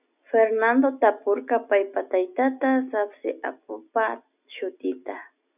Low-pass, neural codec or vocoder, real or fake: 3.6 kHz; none; real